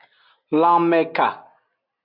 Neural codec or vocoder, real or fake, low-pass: none; real; 5.4 kHz